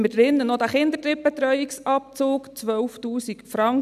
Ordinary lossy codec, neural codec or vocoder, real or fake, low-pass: none; none; real; 14.4 kHz